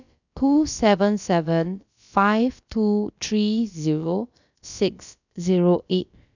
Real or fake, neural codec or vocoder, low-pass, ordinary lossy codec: fake; codec, 16 kHz, about 1 kbps, DyCAST, with the encoder's durations; 7.2 kHz; none